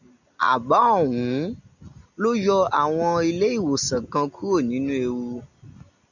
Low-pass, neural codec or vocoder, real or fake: 7.2 kHz; none; real